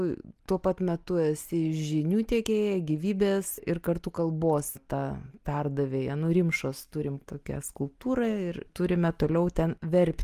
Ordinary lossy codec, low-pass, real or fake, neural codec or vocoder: Opus, 32 kbps; 14.4 kHz; real; none